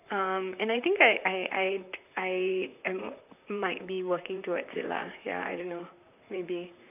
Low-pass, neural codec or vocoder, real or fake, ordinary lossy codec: 3.6 kHz; vocoder, 44.1 kHz, 128 mel bands, Pupu-Vocoder; fake; none